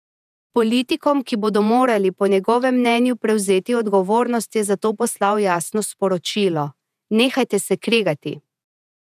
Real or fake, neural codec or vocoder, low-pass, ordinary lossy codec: fake; vocoder, 48 kHz, 128 mel bands, Vocos; 14.4 kHz; none